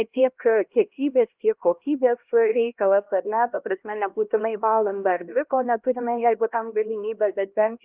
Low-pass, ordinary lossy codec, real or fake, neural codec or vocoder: 3.6 kHz; Opus, 32 kbps; fake; codec, 16 kHz, 1 kbps, X-Codec, HuBERT features, trained on LibriSpeech